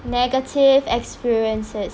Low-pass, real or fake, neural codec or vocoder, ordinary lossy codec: none; real; none; none